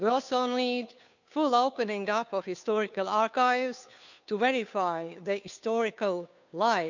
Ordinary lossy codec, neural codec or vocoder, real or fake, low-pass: none; codec, 16 kHz, 2 kbps, FunCodec, trained on Chinese and English, 25 frames a second; fake; 7.2 kHz